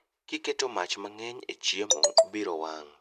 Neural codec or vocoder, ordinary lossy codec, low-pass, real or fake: none; MP3, 96 kbps; 14.4 kHz; real